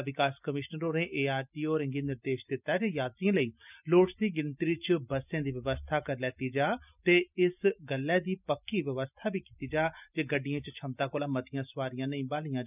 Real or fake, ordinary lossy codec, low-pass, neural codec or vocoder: real; none; 3.6 kHz; none